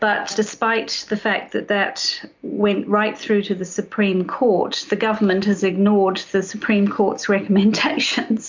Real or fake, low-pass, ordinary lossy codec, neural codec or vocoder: real; 7.2 kHz; MP3, 64 kbps; none